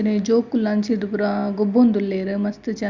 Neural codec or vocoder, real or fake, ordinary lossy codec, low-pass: none; real; Opus, 64 kbps; 7.2 kHz